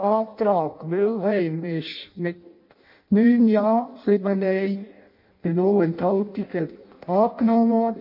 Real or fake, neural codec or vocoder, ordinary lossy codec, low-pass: fake; codec, 16 kHz in and 24 kHz out, 0.6 kbps, FireRedTTS-2 codec; MP3, 32 kbps; 5.4 kHz